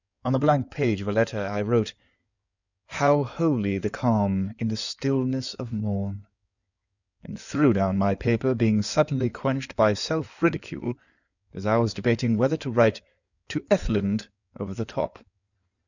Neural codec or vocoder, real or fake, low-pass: codec, 16 kHz in and 24 kHz out, 2.2 kbps, FireRedTTS-2 codec; fake; 7.2 kHz